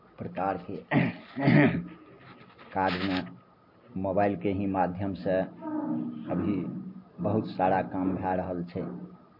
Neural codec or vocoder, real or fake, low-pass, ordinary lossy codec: none; real; 5.4 kHz; MP3, 32 kbps